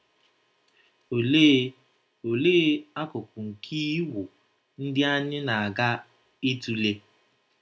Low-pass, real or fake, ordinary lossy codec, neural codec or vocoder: none; real; none; none